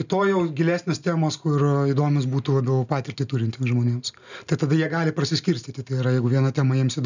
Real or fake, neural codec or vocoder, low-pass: real; none; 7.2 kHz